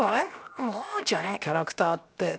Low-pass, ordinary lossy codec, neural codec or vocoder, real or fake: none; none; codec, 16 kHz, 0.7 kbps, FocalCodec; fake